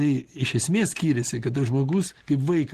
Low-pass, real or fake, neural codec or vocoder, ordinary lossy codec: 14.4 kHz; real; none; Opus, 16 kbps